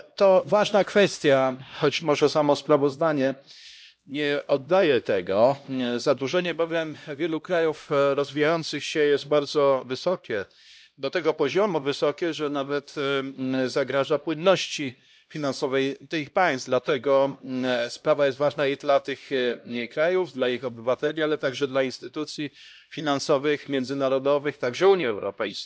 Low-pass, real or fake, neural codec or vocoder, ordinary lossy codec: none; fake; codec, 16 kHz, 1 kbps, X-Codec, HuBERT features, trained on LibriSpeech; none